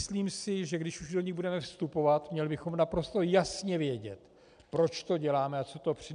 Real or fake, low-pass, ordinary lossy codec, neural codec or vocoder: real; 9.9 kHz; MP3, 96 kbps; none